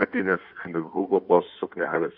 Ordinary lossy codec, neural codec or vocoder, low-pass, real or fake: AAC, 48 kbps; codec, 16 kHz in and 24 kHz out, 1.1 kbps, FireRedTTS-2 codec; 5.4 kHz; fake